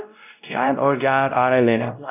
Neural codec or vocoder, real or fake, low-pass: codec, 16 kHz, 0.5 kbps, X-Codec, WavLM features, trained on Multilingual LibriSpeech; fake; 3.6 kHz